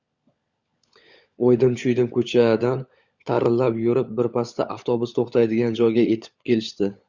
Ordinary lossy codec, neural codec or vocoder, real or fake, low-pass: Opus, 64 kbps; codec, 16 kHz, 16 kbps, FunCodec, trained on LibriTTS, 50 frames a second; fake; 7.2 kHz